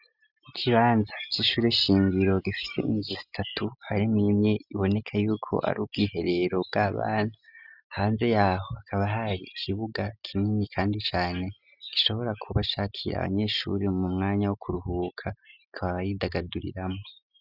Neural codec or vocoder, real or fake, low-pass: none; real; 5.4 kHz